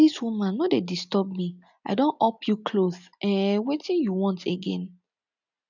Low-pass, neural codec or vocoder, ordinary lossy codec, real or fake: 7.2 kHz; none; none; real